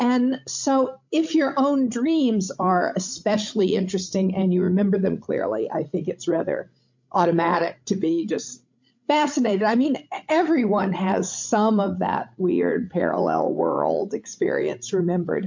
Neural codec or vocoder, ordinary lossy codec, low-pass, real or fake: codec, 16 kHz, 16 kbps, FreqCodec, larger model; MP3, 48 kbps; 7.2 kHz; fake